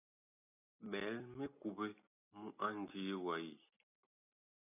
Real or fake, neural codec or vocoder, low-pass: real; none; 3.6 kHz